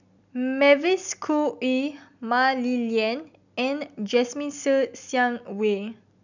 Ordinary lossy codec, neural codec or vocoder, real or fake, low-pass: none; none; real; 7.2 kHz